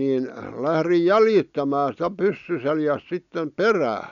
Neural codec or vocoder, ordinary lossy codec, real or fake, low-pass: none; none; real; 7.2 kHz